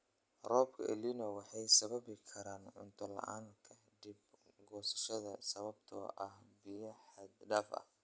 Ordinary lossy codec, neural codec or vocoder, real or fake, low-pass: none; none; real; none